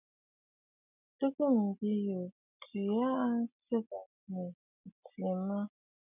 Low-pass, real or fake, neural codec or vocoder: 3.6 kHz; real; none